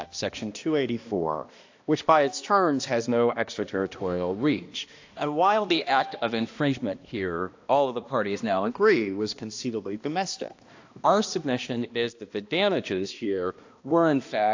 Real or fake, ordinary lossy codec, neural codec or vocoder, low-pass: fake; AAC, 48 kbps; codec, 16 kHz, 1 kbps, X-Codec, HuBERT features, trained on balanced general audio; 7.2 kHz